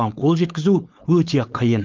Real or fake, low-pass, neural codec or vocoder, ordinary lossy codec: fake; 7.2 kHz; codec, 16 kHz, 4.8 kbps, FACodec; Opus, 24 kbps